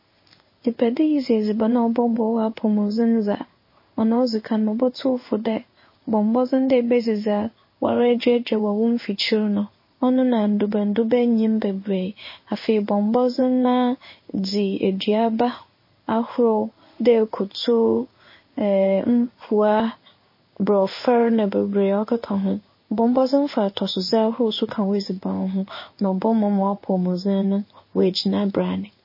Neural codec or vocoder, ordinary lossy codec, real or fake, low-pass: codec, 16 kHz in and 24 kHz out, 1 kbps, XY-Tokenizer; MP3, 24 kbps; fake; 5.4 kHz